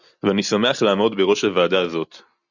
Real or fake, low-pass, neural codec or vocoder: real; 7.2 kHz; none